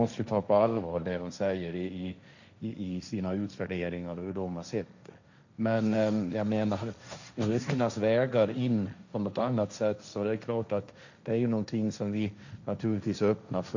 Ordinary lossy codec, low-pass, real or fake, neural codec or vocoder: none; 7.2 kHz; fake; codec, 16 kHz, 1.1 kbps, Voila-Tokenizer